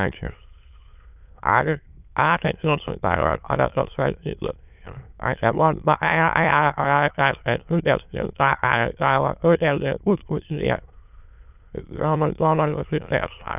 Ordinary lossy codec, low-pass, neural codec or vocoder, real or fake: none; 3.6 kHz; autoencoder, 22.05 kHz, a latent of 192 numbers a frame, VITS, trained on many speakers; fake